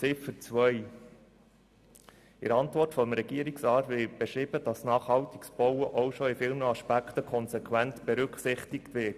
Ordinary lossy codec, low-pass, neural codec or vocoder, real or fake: Opus, 64 kbps; 14.4 kHz; none; real